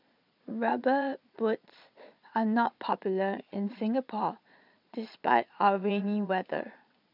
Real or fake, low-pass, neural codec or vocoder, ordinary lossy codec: fake; 5.4 kHz; vocoder, 22.05 kHz, 80 mel bands, Vocos; none